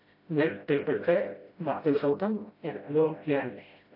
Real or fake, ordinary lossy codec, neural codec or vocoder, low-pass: fake; AAC, 32 kbps; codec, 16 kHz, 0.5 kbps, FreqCodec, smaller model; 5.4 kHz